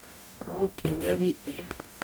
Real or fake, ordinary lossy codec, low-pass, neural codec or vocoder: fake; none; none; codec, 44.1 kHz, 0.9 kbps, DAC